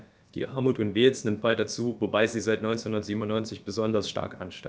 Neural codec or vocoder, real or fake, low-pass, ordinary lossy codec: codec, 16 kHz, about 1 kbps, DyCAST, with the encoder's durations; fake; none; none